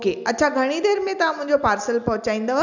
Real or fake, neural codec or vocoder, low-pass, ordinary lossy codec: real; none; 7.2 kHz; none